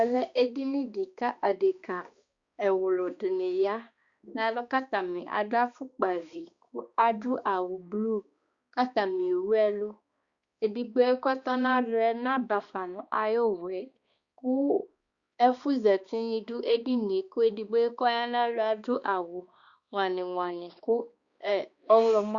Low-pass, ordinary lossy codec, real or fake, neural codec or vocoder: 7.2 kHz; Opus, 64 kbps; fake; codec, 16 kHz, 2 kbps, X-Codec, HuBERT features, trained on balanced general audio